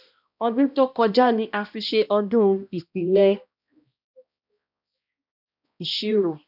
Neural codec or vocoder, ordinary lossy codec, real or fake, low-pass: codec, 16 kHz, 1 kbps, X-Codec, HuBERT features, trained on balanced general audio; none; fake; 5.4 kHz